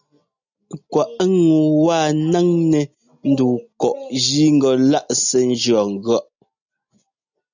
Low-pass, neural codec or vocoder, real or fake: 7.2 kHz; none; real